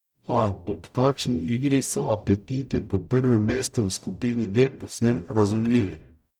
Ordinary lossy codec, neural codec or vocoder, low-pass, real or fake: none; codec, 44.1 kHz, 0.9 kbps, DAC; 19.8 kHz; fake